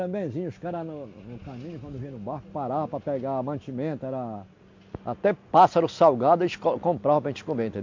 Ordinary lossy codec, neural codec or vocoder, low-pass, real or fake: MP3, 48 kbps; none; 7.2 kHz; real